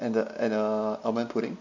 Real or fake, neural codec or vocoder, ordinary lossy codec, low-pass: real; none; none; 7.2 kHz